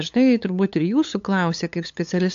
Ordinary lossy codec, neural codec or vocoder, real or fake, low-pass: AAC, 64 kbps; codec, 16 kHz, 8 kbps, FunCodec, trained on LibriTTS, 25 frames a second; fake; 7.2 kHz